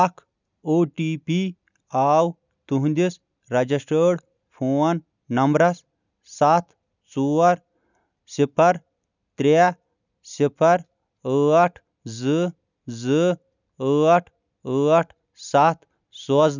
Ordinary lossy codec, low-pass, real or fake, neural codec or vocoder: none; 7.2 kHz; real; none